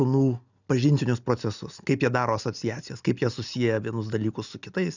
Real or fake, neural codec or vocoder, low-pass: real; none; 7.2 kHz